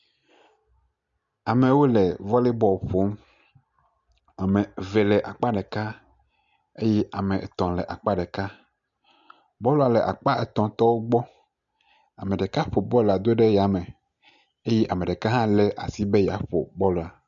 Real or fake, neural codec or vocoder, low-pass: real; none; 7.2 kHz